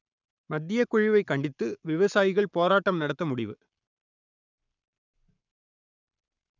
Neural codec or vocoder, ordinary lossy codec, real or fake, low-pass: codec, 44.1 kHz, 7.8 kbps, Pupu-Codec; none; fake; 7.2 kHz